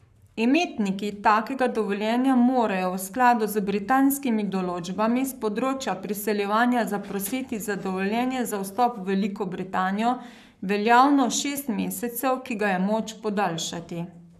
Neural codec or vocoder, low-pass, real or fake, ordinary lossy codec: codec, 44.1 kHz, 7.8 kbps, Pupu-Codec; 14.4 kHz; fake; none